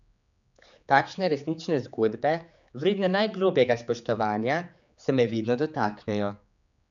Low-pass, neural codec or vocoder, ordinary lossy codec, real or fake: 7.2 kHz; codec, 16 kHz, 4 kbps, X-Codec, HuBERT features, trained on general audio; none; fake